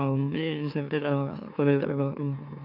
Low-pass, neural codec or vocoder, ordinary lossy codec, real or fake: 5.4 kHz; autoencoder, 44.1 kHz, a latent of 192 numbers a frame, MeloTTS; none; fake